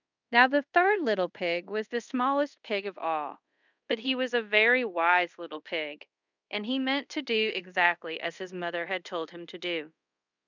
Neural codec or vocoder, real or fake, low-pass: codec, 24 kHz, 0.5 kbps, DualCodec; fake; 7.2 kHz